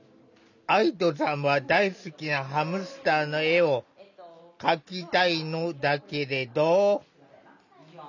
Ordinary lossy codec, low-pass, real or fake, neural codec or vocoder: none; 7.2 kHz; real; none